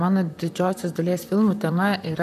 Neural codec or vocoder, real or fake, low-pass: vocoder, 44.1 kHz, 128 mel bands, Pupu-Vocoder; fake; 14.4 kHz